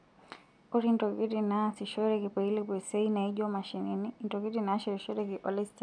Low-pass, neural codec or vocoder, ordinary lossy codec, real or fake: 9.9 kHz; none; none; real